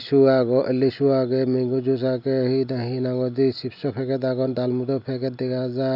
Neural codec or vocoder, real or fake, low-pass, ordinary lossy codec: none; real; 5.4 kHz; none